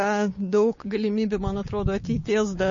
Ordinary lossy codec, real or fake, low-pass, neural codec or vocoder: MP3, 32 kbps; fake; 7.2 kHz; codec, 16 kHz, 6 kbps, DAC